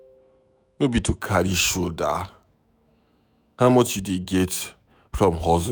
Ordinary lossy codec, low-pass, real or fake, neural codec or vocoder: none; none; fake; autoencoder, 48 kHz, 128 numbers a frame, DAC-VAE, trained on Japanese speech